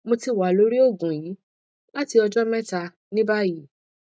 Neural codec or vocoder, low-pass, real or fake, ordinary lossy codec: none; none; real; none